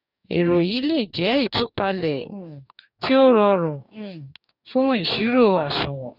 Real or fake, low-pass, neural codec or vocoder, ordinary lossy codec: fake; 5.4 kHz; codec, 44.1 kHz, 2.6 kbps, DAC; none